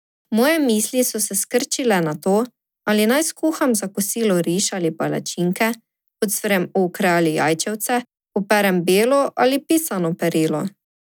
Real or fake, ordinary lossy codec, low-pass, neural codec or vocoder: real; none; none; none